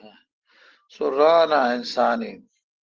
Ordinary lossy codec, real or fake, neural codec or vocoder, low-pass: Opus, 16 kbps; real; none; 7.2 kHz